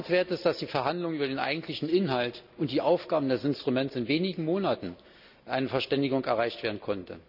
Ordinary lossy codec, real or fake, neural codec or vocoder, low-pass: none; real; none; 5.4 kHz